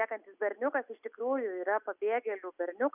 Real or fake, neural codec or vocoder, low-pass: real; none; 3.6 kHz